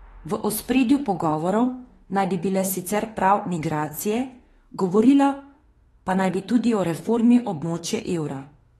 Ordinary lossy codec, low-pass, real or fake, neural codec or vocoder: AAC, 32 kbps; 19.8 kHz; fake; autoencoder, 48 kHz, 32 numbers a frame, DAC-VAE, trained on Japanese speech